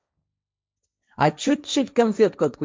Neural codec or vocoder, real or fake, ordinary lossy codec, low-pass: codec, 16 kHz, 1.1 kbps, Voila-Tokenizer; fake; none; none